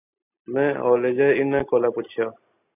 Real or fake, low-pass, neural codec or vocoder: real; 3.6 kHz; none